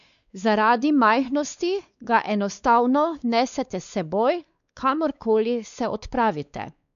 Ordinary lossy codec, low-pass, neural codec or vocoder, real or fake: none; 7.2 kHz; codec, 16 kHz, 4 kbps, X-Codec, WavLM features, trained on Multilingual LibriSpeech; fake